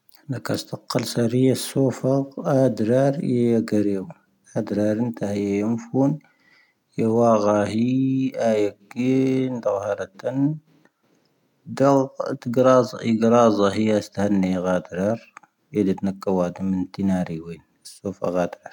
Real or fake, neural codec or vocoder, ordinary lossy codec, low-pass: real; none; none; 19.8 kHz